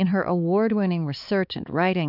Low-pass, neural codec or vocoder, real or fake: 5.4 kHz; codec, 16 kHz, 4 kbps, X-Codec, HuBERT features, trained on balanced general audio; fake